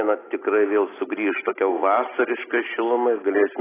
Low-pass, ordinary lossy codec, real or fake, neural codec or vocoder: 3.6 kHz; AAC, 16 kbps; real; none